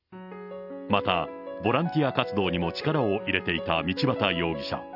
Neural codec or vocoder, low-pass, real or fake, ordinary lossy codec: none; 5.4 kHz; real; none